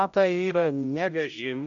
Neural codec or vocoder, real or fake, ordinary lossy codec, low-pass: codec, 16 kHz, 0.5 kbps, X-Codec, HuBERT features, trained on general audio; fake; AAC, 64 kbps; 7.2 kHz